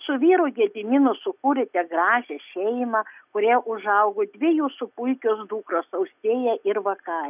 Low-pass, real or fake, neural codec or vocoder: 3.6 kHz; real; none